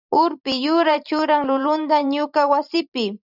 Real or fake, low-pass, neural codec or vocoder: fake; 5.4 kHz; vocoder, 44.1 kHz, 128 mel bands every 512 samples, BigVGAN v2